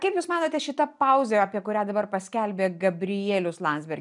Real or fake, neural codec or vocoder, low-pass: real; none; 10.8 kHz